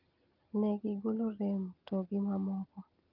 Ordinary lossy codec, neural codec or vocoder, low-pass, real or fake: Opus, 24 kbps; none; 5.4 kHz; real